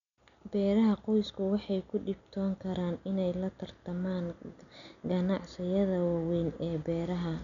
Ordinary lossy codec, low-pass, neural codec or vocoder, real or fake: none; 7.2 kHz; none; real